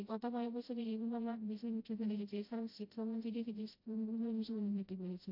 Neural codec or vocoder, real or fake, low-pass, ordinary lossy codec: codec, 16 kHz, 0.5 kbps, FreqCodec, smaller model; fake; 5.4 kHz; AAC, 32 kbps